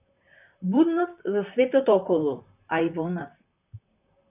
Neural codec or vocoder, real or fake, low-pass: vocoder, 44.1 kHz, 128 mel bands, Pupu-Vocoder; fake; 3.6 kHz